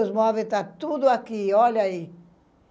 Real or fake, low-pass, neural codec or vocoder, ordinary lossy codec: real; none; none; none